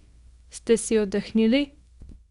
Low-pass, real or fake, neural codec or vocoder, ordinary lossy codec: 10.8 kHz; fake; codec, 24 kHz, 0.9 kbps, WavTokenizer, small release; none